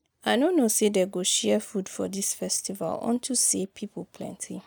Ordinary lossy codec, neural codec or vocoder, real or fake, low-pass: none; none; real; none